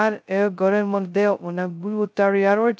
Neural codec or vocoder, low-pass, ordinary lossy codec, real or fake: codec, 16 kHz, 0.2 kbps, FocalCodec; none; none; fake